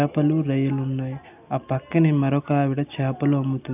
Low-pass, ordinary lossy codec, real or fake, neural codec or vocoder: 3.6 kHz; AAC, 32 kbps; real; none